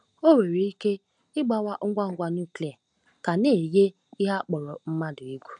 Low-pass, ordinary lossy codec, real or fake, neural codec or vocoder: 9.9 kHz; none; real; none